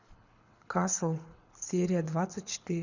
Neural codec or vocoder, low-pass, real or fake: codec, 24 kHz, 6 kbps, HILCodec; 7.2 kHz; fake